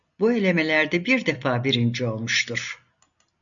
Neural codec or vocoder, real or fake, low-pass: none; real; 7.2 kHz